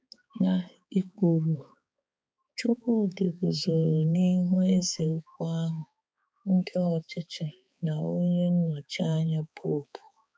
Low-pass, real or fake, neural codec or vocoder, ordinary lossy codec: none; fake; codec, 16 kHz, 4 kbps, X-Codec, HuBERT features, trained on balanced general audio; none